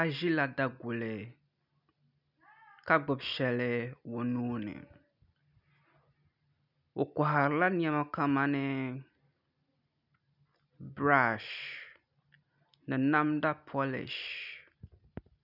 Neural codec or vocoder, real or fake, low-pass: none; real; 5.4 kHz